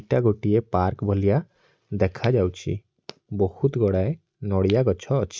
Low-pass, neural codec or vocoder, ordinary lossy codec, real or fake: none; none; none; real